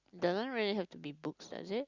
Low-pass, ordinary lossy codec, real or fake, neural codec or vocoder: 7.2 kHz; Opus, 64 kbps; real; none